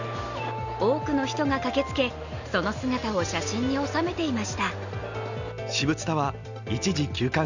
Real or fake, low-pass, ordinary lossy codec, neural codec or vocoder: real; 7.2 kHz; none; none